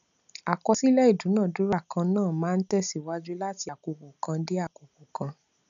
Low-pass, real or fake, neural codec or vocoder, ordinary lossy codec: 7.2 kHz; real; none; none